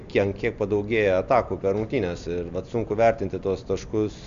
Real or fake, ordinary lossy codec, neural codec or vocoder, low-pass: real; MP3, 64 kbps; none; 7.2 kHz